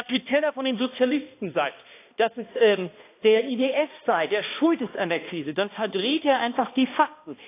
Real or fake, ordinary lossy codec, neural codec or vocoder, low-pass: fake; AAC, 24 kbps; codec, 16 kHz, 1 kbps, X-Codec, HuBERT features, trained on balanced general audio; 3.6 kHz